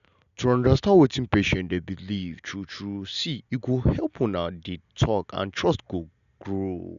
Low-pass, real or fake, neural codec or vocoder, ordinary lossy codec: 7.2 kHz; real; none; none